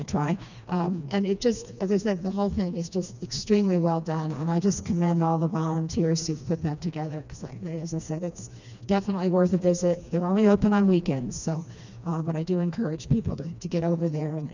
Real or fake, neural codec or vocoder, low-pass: fake; codec, 16 kHz, 2 kbps, FreqCodec, smaller model; 7.2 kHz